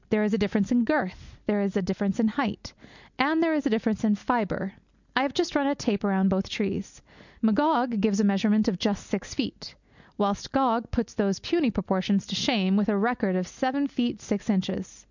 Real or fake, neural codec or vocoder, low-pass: real; none; 7.2 kHz